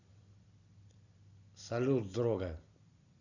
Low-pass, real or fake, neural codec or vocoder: 7.2 kHz; real; none